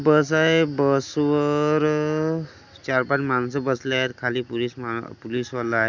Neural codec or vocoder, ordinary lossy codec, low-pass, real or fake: none; none; 7.2 kHz; real